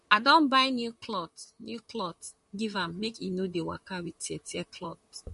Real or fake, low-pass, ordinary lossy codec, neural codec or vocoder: fake; 14.4 kHz; MP3, 48 kbps; vocoder, 44.1 kHz, 128 mel bands, Pupu-Vocoder